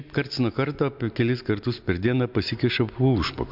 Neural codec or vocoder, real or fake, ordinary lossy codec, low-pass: none; real; MP3, 48 kbps; 5.4 kHz